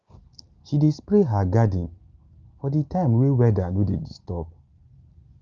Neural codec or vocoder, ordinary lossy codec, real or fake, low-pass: none; Opus, 24 kbps; real; 7.2 kHz